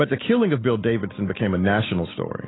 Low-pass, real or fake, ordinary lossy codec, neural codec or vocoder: 7.2 kHz; real; AAC, 16 kbps; none